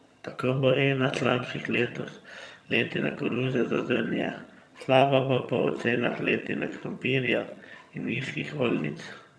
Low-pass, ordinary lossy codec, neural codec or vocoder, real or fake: none; none; vocoder, 22.05 kHz, 80 mel bands, HiFi-GAN; fake